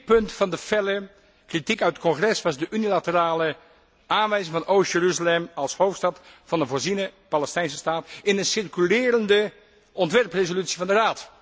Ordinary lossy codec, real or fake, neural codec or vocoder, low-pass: none; real; none; none